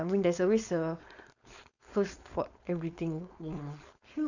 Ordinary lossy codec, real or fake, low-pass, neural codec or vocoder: none; fake; 7.2 kHz; codec, 16 kHz, 4.8 kbps, FACodec